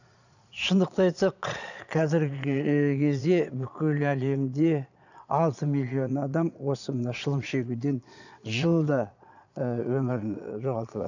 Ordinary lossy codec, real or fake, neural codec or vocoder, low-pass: none; fake; vocoder, 22.05 kHz, 80 mel bands, Vocos; 7.2 kHz